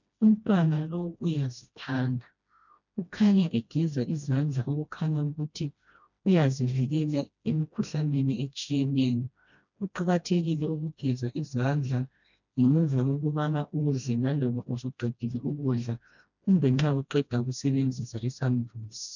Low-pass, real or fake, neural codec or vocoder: 7.2 kHz; fake; codec, 16 kHz, 1 kbps, FreqCodec, smaller model